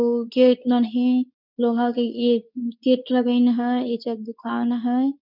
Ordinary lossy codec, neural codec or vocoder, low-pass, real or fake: none; codec, 24 kHz, 0.9 kbps, WavTokenizer, medium speech release version 2; 5.4 kHz; fake